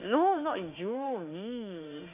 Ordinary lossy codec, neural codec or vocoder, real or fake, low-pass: none; autoencoder, 48 kHz, 32 numbers a frame, DAC-VAE, trained on Japanese speech; fake; 3.6 kHz